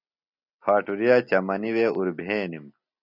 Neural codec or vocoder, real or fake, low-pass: none; real; 5.4 kHz